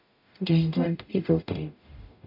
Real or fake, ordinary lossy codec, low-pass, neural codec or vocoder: fake; none; 5.4 kHz; codec, 44.1 kHz, 0.9 kbps, DAC